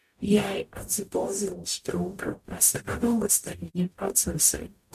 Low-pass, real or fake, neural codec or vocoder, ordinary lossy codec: 14.4 kHz; fake; codec, 44.1 kHz, 0.9 kbps, DAC; MP3, 64 kbps